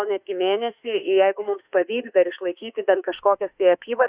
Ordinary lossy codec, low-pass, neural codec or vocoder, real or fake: Opus, 64 kbps; 3.6 kHz; autoencoder, 48 kHz, 32 numbers a frame, DAC-VAE, trained on Japanese speech; fake